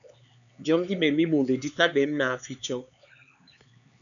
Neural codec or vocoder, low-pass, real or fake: codec, 16 kHz, 4 kbps, X-Codec, HuBERT features, trained on LibriSpeech; 7.2 kHz; fake